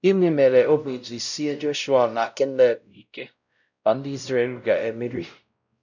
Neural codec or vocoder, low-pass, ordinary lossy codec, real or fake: codec, 16 kHz, 0.5 kbps, X-Codec, WavLM features, trained on Multilingual LibriSpeech; 7.2 kHz; none; fake